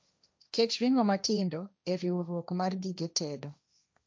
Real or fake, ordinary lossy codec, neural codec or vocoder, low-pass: fake; none; codec, 16 kHz, 1.1 kbps, Voila-Tokenizer; none